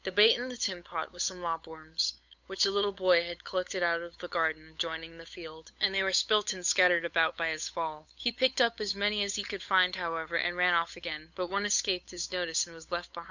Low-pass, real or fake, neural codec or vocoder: 7.2 kHz; fake; codec, 16 kHz, 8 kbps, FunCodec, trained on Chinese and English, 25 frames a second